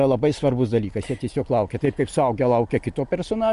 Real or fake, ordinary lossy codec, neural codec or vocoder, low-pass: real; Opus, 64 kbps; none; 10.8 kHz